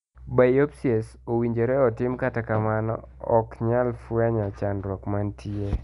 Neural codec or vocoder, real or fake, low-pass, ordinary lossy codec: none; real; 10.8 kHz; none